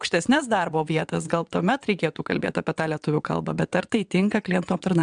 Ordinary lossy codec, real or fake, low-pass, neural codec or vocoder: MP3, 96 kbps; fake; 9.9 kHz; vocoder, 22.05 kHz, 80 mel bands, WaveNeXt